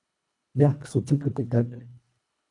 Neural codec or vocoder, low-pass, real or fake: codec, 24 kHz, 1.5 kbps, HILCodec; 10.8 kHz; fake